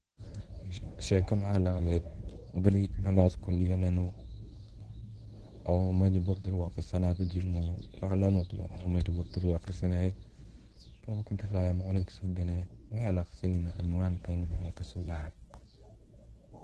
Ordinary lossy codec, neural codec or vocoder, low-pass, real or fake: Opus, 16 kbps; codec, 24 kHz, 0.9 kbps, WavTokenizer, medium speech release version 1; 10.8 kHz; fake